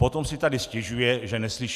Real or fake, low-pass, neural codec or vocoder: real; 14.4 kHz; none